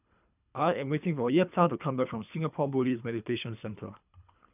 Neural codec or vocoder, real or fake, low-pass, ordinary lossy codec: codec, 24 kHz, 3 kbps, HILCodec; fake; 3.6 kHz; none